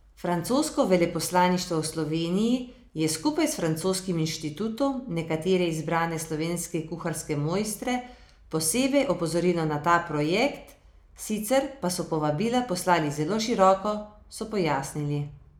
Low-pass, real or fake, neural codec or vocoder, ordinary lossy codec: none; real; none; none